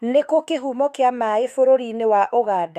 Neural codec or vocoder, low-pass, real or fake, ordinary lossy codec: autoencoder, 48 kHz, 32 numbers a frame, DAC-VAE, trained on Japanese speech; 14.4 kHz; fake; none